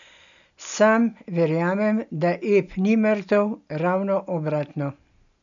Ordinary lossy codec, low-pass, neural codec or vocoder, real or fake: none; 7.2 kHz; none; real